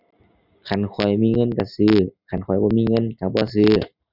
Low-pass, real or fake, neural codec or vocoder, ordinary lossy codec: 5.4 kHz; real; none; none